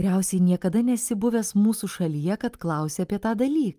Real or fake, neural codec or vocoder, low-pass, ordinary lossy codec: real; none; 14.4 kHz; Opus, 32 kbps